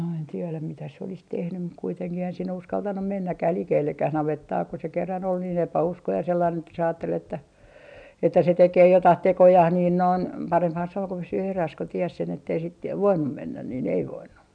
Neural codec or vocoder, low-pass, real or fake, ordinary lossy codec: none; 9.9 kHz; real; none